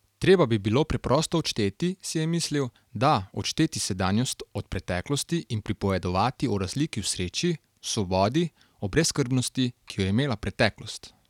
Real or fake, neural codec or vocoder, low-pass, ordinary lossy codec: real; none; 19.8 kHz; none